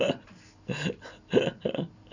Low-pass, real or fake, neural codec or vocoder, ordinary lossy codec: 7.2 kHz; real; none; none